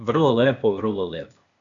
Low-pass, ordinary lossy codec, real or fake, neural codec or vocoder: 7.2 kHz; MP3, 96 kbps; fake; codec, 16 kHz, 0.8 kbps, ZipCodec